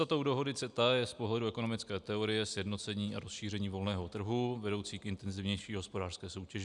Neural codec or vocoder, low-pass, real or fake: none; 10.8 kHz; real